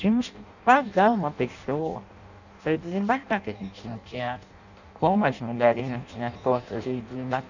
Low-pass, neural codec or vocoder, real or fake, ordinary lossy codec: 7.2 kHz; codec, 16 kHz in and 24 kHz out, 0.6 kbps, FireRedTTS-2 codec; fake; none